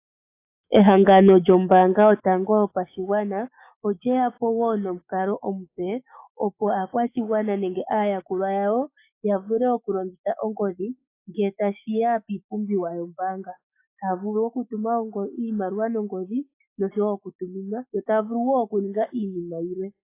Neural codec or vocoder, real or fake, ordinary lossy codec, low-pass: autoencoder, 48 kHz, 128 numbers a frame, DAC-VAE, trained on Japanese speech; fake; AAC, 24 kbps; 3.6 kHz